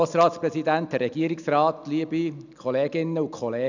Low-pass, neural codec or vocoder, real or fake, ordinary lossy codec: 7.2 kHz; none; real; none